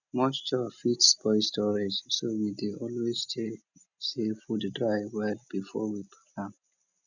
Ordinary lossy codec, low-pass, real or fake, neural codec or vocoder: none; 7.2 kHz; real; none